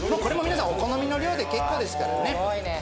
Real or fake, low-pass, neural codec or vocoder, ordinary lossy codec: real; none; none; none